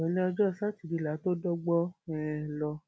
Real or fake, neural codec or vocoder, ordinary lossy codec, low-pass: real; none; none; none